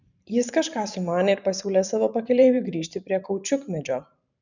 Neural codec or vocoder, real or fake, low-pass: vocoder, 44.1 kHz, 128 mel bands every 512 samples, BigVGAN v2; fake; 7.2 kHz